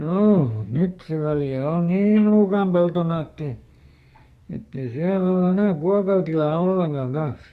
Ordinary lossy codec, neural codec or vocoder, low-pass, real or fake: Opus, 64 kbps; codec, 44.1 kHz, 2.6 kbps, SNAC; 14.4 kHz; fake